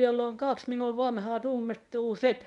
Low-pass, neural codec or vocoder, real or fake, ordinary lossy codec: 10.8 kHz; codec, 24 kHz, 0.9 kbps, WavTokenizer, medium speech release version 1; fake; MP3, 96 kbps